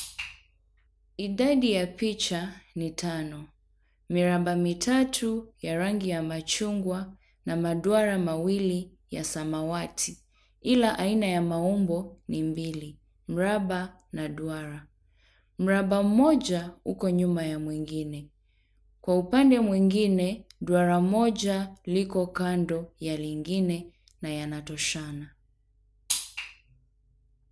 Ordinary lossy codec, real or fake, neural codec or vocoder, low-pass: none; real; none; none